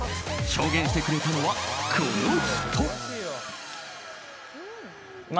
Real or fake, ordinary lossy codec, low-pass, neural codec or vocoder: real; none; none; none